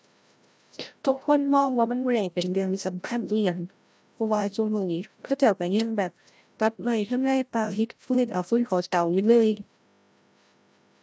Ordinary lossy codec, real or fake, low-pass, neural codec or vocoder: none; fake; none; codec, 16 kHz, 0.5 kbps, FreqCodec, larger model